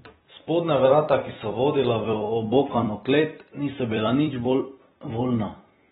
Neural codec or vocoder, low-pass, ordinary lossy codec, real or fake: vocoder, 44.1 kHz, 128 mel bands every 256 samples, BigVGAN v2; 19.8 kHz; AAC, 16 kbps; fake